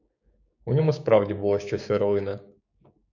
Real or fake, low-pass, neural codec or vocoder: fake; 7.2 kHz; codec, 16 kHz, 6 kbps, DAC